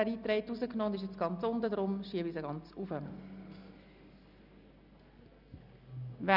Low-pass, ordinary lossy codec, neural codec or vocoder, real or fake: 5.4 kHz; none; none; real